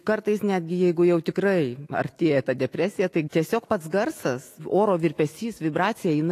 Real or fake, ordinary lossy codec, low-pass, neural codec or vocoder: fake; AAC, 48 kbps; 14.4 kHz; autoencoder, 48 kHz, 128 numbers a frame, DAC-VAE, trained on Japanese speech